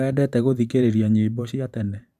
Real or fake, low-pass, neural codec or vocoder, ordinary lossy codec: fake; 14.4 kHz; vocoder, 44.1 kHz, 128 mel bands every 512 samples, BigVGAN v2; none